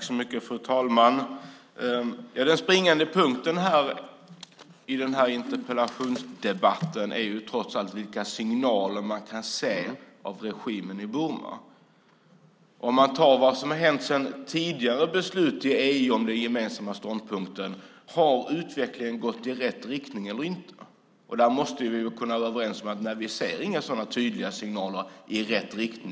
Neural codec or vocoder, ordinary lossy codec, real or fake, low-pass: none; none; real; none